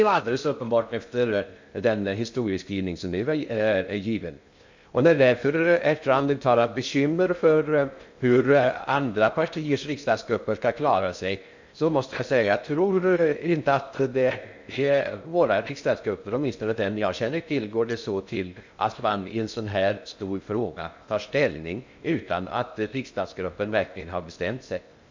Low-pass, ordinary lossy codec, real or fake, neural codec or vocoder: 7.2 kHz; MP3, 64 kbps; fake; codec, 16 kHz in and 24 kHz out, 0.6 kbps, FocalCodec, streaming, 2048 codes